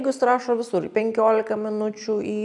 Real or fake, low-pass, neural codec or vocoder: real; 10.8 kHz; none